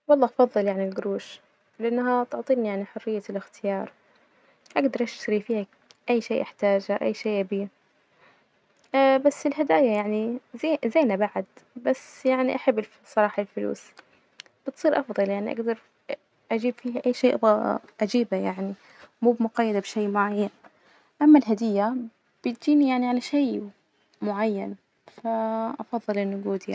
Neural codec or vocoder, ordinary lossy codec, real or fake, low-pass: none; none; real; none